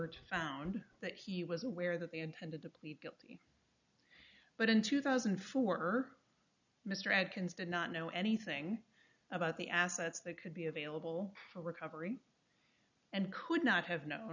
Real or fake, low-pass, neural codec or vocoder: real; 7.2 kHz; none